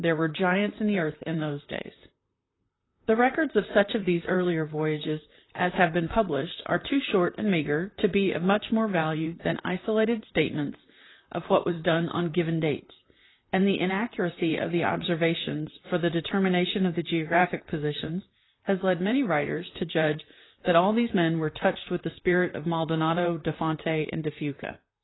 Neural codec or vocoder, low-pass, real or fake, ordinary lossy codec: vocoder, 44.1 kHz, 128 mel bands, Pupu-Vocoder; 7.2 kHz; fake; AAC, 16 kbps